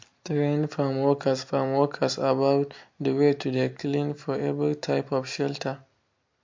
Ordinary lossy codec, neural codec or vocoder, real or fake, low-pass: MP3, 48 kbps; none; real; 7.2 kHz